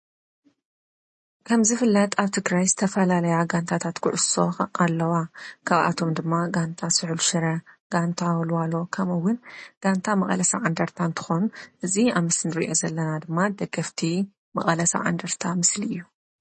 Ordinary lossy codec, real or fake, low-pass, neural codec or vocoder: MP3, 32 kbps; real; 10.8 kHz; none